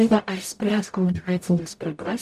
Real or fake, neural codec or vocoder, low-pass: fake; codec, 44.1 kHz, 0.9 kbps, DAC; 14.4 kHz